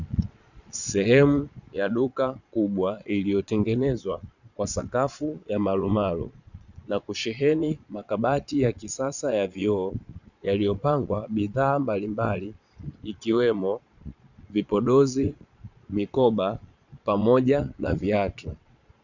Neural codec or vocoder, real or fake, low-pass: vocoder, 22.05 kHz, 80 mel bands, Vocos; fake; 7.2 kHz